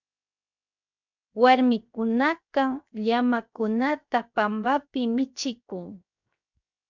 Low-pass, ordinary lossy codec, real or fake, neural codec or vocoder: 7.2 kHz; MP3, 64 kbps; fake; codec, 16 kHz, 0.7 kbps, FocalCodec